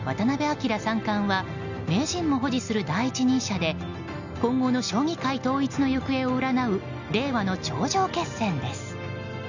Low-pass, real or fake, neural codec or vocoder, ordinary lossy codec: 7.2 kHz; real; none; none